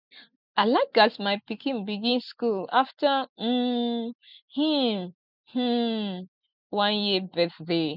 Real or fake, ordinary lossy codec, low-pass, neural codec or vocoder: real; none; 5.4 kHz; none